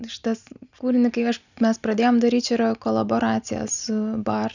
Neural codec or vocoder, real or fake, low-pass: none; real; 7.2 kHz